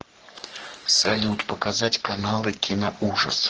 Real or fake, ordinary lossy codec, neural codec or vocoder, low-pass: fake; Opus, 16 kbps; codec, 44.1 kHz, 3.4 kbps, Pupu-Codec; 7.2 kHz